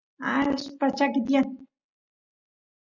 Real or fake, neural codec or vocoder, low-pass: real; none; 7.2 kHz